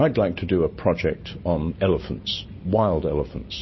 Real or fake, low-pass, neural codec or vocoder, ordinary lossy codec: real; 7.2 kHz; none; MP3, 24 kbps